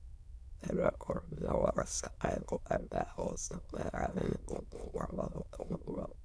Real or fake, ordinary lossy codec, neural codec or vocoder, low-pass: fake; none; autoencoder, 22.05 kHz, a latent of 192 numbers a frame, VITS, trained on many speakers; none